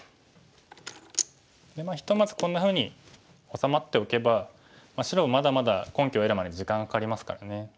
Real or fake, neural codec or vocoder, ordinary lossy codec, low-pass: real; none; none; none